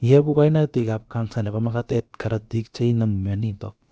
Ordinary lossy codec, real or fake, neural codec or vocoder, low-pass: none; fake; codec, 16 kHz, about 1 kbps, DyCAST, with the encoder's durations; none